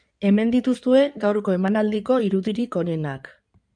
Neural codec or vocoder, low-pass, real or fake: codec, 16 kHz in and 24 kHz out, 2.2 kbps, FireRedTTS-2 codec; 9.9 kHz; fake